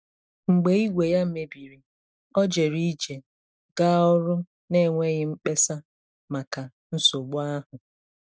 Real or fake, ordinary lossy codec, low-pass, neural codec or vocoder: real; none; none; none